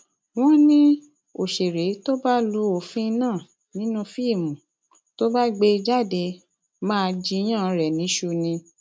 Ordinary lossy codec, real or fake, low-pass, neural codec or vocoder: none; real; none; none